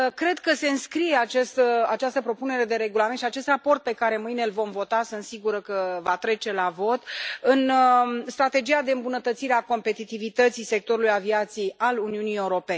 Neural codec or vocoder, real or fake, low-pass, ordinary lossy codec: none; real; none; none